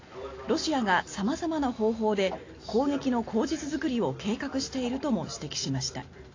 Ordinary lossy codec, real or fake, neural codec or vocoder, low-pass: AAC, 32 kbps; real; none; 7.2 kHz